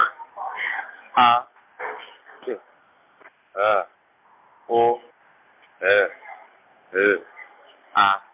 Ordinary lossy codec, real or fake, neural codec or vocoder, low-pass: MP3, 32 kbps; real; none; 3.6 kHz